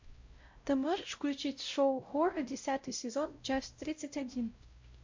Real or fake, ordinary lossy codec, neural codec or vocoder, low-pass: fake; MP3, 48 kbps; codec, 16 kHz, 0.5 kbps, X-Codec, WavLM features, trained on Multilingual LibriSpeech; 7.2 kHz